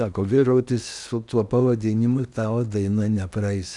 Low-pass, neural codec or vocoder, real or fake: 10.8 kHz; codec, 16 kHz in and 24 kHz out, 0.8 kbps, FocalCodec, streaming, 65536 codes; fake